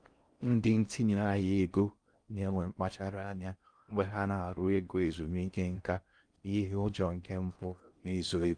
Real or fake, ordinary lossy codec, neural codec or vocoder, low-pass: fake; Opus, 32 kbps; codec, 16 kHz in and 24 kHz out, 0.6 kbps, FocalCodec, streaming, 4096 codes; 9.9 kHz